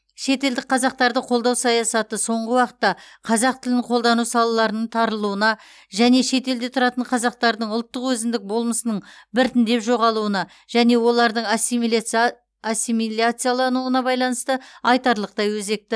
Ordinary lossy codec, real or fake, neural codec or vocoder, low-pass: none; real; none; none